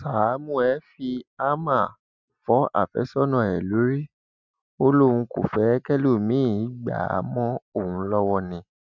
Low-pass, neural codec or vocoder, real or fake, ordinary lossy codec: 7.2 kHz; none; real; none